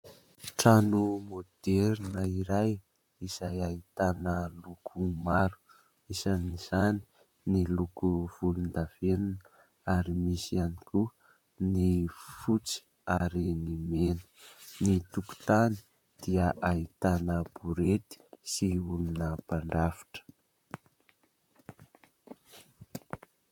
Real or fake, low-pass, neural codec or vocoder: fake; 19.8 kHz; vocoder, 44.1 kHz, 128 mel bands, Pupu-Vocoder